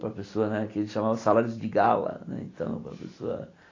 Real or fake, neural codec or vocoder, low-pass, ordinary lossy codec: real; none; 7.2 kHz; AAC, 32 kbps